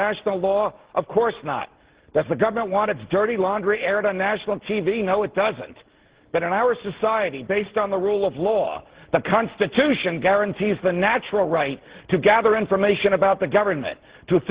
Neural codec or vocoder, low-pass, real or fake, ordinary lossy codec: none; 3.6 kHz; real; Opus, 16 kbps